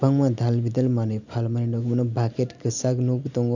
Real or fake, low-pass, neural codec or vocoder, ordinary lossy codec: real; 7.2 kHz; none; none